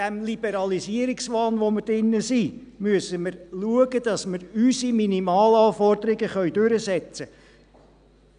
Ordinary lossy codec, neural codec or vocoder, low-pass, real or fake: none; none; 9.9 kHz; real